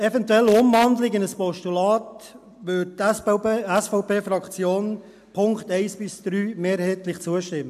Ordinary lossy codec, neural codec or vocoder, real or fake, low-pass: AAC, 96 kbps; none; real; 14.4 kHz